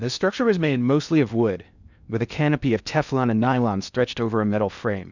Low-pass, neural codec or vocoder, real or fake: 7.2 kHz; codec, 16 kHz in and 24 kHz out, 0.6 kbps, FocalCodec, streaming, 2048 codes; fake